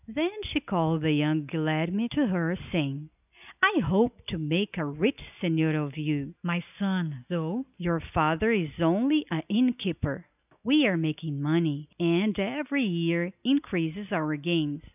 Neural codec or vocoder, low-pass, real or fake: none; 3.6 kHz; real